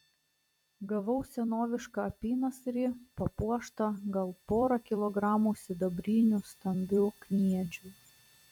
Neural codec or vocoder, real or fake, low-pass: vocoder, 44.1 kHz, 128 mel bands every 256 samples, BigVGAN v2; fake; 19.8 kHz